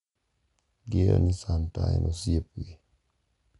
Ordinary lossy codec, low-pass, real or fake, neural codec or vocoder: none; 10.8 kHz; real; none